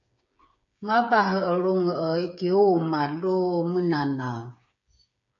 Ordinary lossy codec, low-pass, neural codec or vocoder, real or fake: AAC, 64 kbps; 7.2 kHz; codec, 16 kHz, 8 kbps, FreqCodec, smaller model; fake